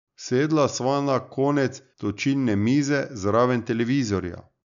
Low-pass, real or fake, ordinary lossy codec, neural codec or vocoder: 7.2 kHz; real; none; none